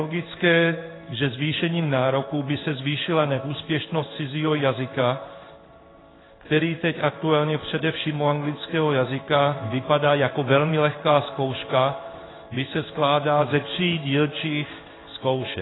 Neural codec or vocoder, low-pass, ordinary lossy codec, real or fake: codec, 16 kHz in and 24 kHz out, 1 kbps, XY-Tokenizer; 7.2 kHz; AAC, 16 kbps; fake